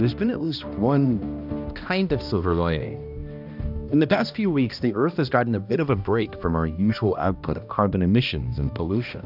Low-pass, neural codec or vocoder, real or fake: 5.4 kHz; codec, 16 kHz, 1 kbps, X-Codec, HuBERT features, trained on balanced general audio; fake